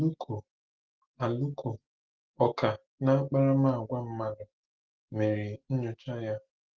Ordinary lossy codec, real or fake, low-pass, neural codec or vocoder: Opus, 16 kbps; real; 7.2 kHz; none